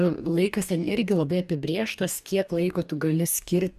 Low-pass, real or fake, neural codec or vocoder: 14.4 kHz; fake; codec, 44.1 kHz, 2.6 kbps, DAC